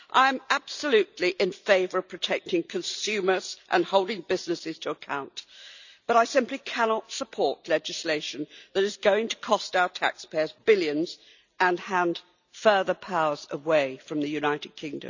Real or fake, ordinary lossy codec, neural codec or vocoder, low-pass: real; none; none; 7.2 kHz